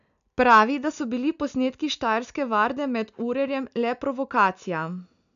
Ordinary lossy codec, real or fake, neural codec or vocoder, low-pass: none; real; none; 7.2 kHz